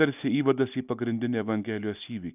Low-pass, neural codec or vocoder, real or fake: 3.6 kHz; none; real